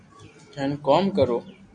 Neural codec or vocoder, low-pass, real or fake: none; 9.9 kHz; real